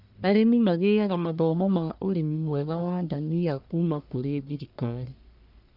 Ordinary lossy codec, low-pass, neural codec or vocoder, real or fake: none; 5.4 kHz; codec, 44.1 kHz, 1.7 kbps, Pupu-Codec; fake